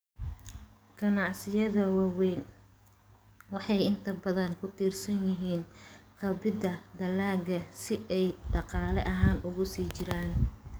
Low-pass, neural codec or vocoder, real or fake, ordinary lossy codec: none; codec, 44.1 kHz, 7.8 kbps, DAC; fake; none